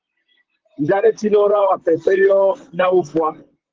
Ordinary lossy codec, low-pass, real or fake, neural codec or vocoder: Opus, 16 kbps; 7.2 kHz; fake; vocoder, 44.1 kHz, 128 mel bands, Pupu-Vocoder